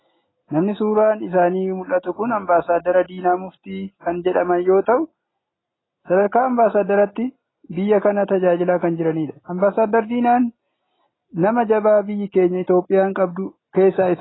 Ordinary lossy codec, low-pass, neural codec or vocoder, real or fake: AAC, 16 kbps; 7.2 kHz; none; real